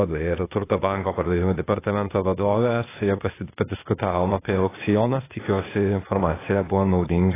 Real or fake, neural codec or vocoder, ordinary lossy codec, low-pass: fake; codec, 16 kHz, 0.8 kbps, ZipCodec; AAC, 16 kbps; 3.6 kHz